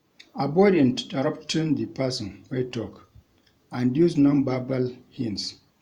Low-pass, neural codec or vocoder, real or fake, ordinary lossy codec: 19.8 kHz; vocoder, 48 kHz, 128 mel bands, Vocos; fake; Opus, 64 kbps